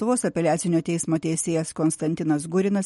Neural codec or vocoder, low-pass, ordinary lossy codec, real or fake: none; 19.8 kHz; MP3, 48 kbps; real